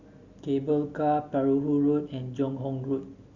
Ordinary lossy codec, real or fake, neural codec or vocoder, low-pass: Opus, 64 kbps; real; none; 7.2 kHz